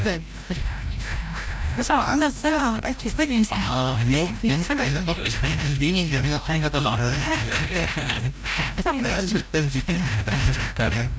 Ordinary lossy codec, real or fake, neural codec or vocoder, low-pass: none; fake; codec, 16 kHz, 0.5 kbps, FreqCodec, larger model; none